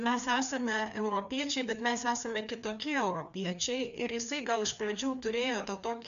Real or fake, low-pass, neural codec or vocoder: fake; 7.2 kHz; codec, 16 kHz, 2 kbps, FreqCodec, larger model